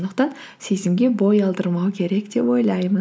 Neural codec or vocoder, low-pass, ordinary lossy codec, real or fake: none; none; none; real